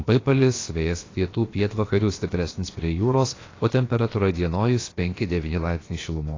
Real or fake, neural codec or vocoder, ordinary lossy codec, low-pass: fake; codec, 16 kHz, about 1 kbps, DyCAST, with the encoder's durations; AAC, 32 kbps; 7.2 kHz